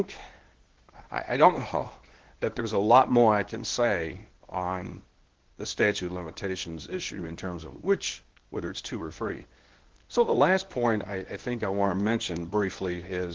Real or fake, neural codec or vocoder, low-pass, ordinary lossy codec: fake; codec, 24 kHz, 0.9 kbps, WavTokenizer, medium speech release version 1; 7.2 kHz; Opus, 16 kbps